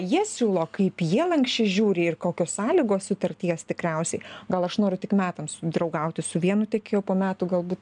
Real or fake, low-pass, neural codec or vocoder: real; 9.9 kHz; none